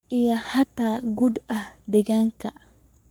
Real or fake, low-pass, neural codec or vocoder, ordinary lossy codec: fake; none; codec, 44.1 kHz, 3.4 kbps, Pupu-Codec; none